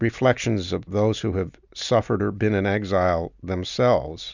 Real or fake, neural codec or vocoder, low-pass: real; none; 7.2 kHz